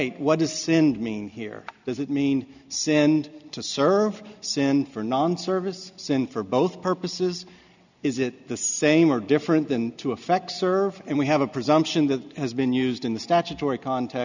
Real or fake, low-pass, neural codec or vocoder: real; 7.2 kHz; none